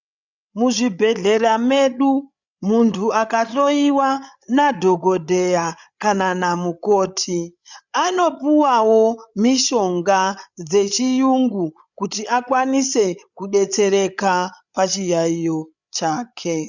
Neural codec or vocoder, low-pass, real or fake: codec, 16 kHz, 8 kbps, FreqCodec, larger model; 7.2 kHz; fake